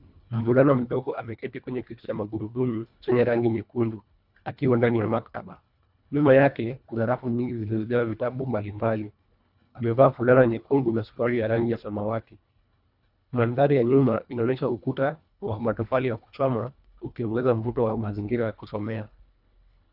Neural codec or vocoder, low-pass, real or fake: codec, 24 kHz, 1.5 kbps, HILCodec; 5.4 kHz; fake